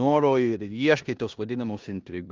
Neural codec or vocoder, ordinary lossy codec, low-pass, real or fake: codec, 16 kHz in and 24 kHz out, 0.9 kbps, LongCat-Audio-Codec, four codebook decoder; Opus, 32 kbps; 7.2 kHz; fake